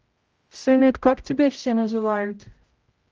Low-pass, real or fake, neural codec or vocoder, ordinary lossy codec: 7.2 kHz; fake; codec, 16 kHz, 0.5 kbps, X-Codec, HuBERT features, trained on general audio; Opus, 24 kbps